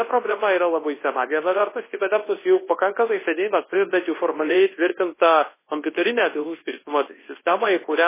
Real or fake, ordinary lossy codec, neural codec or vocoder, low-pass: fake; MP3, 16 kbps; codec, 24 kHz, 0.9 kbps, WavTokenizer, large speech release; 3.6 kHz